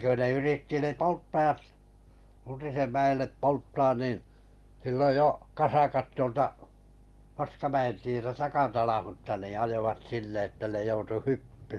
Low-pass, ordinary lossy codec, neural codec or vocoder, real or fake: 19.8 kHz; Opus, 24 kbps; none; real